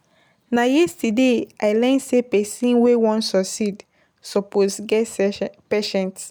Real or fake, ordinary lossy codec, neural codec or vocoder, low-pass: real; none; none; none